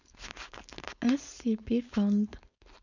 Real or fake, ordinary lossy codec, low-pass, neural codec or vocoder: fake; none; 7.2 kHz; codec, 16 kHz, 4.8 kbps, FACodec